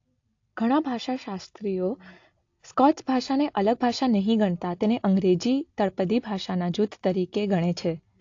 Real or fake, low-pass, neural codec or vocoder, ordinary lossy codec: real; 7.2 kHz; none; AAC, 48 kbps